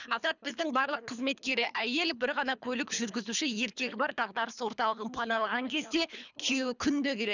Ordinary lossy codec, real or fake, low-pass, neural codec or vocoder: none; fake; 7.2 kHz; codec, 24 kHz, 3 kbps, HILCodec